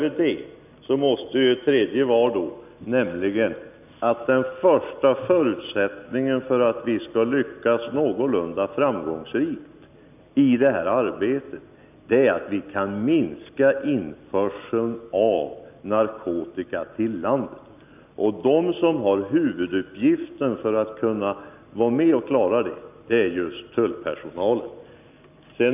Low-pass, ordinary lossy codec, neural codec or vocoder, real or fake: 3.6 kHz; none; none; real